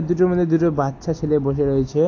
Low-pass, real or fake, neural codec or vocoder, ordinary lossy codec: 7.2 kHz; real; none; AAC, 48 kbps